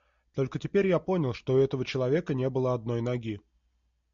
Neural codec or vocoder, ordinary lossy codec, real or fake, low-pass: none; MP3, 64 kbps; real; 7.2 kHz